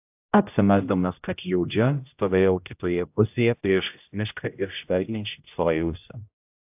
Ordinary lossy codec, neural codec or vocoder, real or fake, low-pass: AAC, 32 kbps; codec, 16 kHz, 0.5 kbps, X-Codec, HuBERT features, trained on general audio; fake; 3.6 kHz